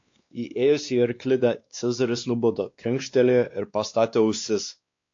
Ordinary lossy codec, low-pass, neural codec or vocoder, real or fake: AAC, 48 kbps; 7.2 kHz; codec, 16 kHz, 2 kbps, X-Codec, WavLM features, trained on Multilingual LibriSpeech; fake